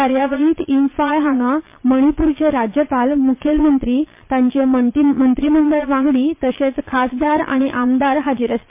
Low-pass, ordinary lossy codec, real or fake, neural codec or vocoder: 3.6 kHz; MP3, 24 kbps; fake; vocoder, 22.05 kHz, 80 mel bands, Vocos